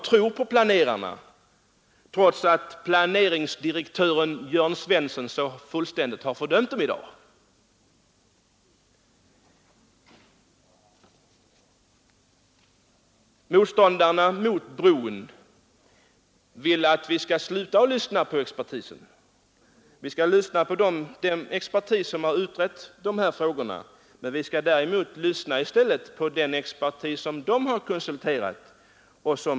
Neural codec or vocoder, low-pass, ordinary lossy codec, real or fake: none; none; none; real